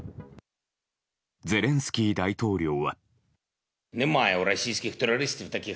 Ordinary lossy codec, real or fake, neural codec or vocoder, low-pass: none; real; none; none